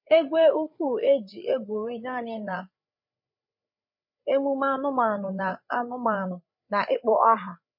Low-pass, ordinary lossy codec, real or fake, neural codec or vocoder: 5.4 kHz; MP3, 32 kbps; fake; codec, 16 kHz, 8 kbps, FreqCodec, larger model